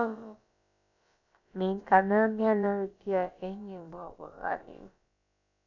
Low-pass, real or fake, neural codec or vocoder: 7.2 kHz; fake; codec, 16 kHz, about 1 kbps, DyCAST, with the encoder's durations